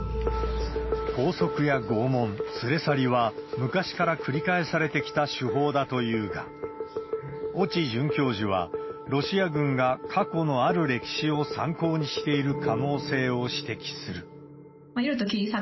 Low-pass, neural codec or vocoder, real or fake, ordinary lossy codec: 7.2 kHz; none; real; MP3, 24 kbps